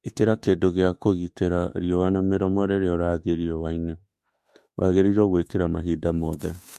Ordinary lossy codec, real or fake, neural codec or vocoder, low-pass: MP3, 64 kbps; fake; autoencoder, 48 kHz, 32 numbers a frame, DAC-VAE, trained on Japanese speech; 14.4 kHz